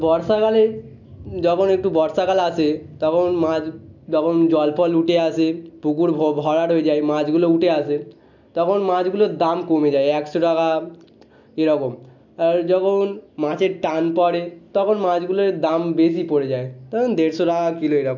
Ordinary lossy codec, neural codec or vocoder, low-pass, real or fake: none; none; 7.2 kHz; real